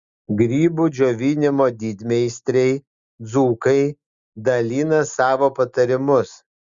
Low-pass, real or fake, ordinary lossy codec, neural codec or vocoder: 7.2 kHz; real; Opus, 64 kbps; none